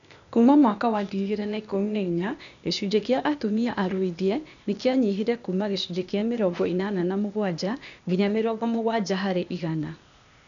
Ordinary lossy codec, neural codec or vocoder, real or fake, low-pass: none; codec, 16 kHz, 0.8 kbps, ZipCodec; fake; 7.2 kHz